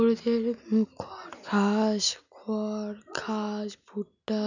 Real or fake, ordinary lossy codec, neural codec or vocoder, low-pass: real; none; none; 7.2 kHz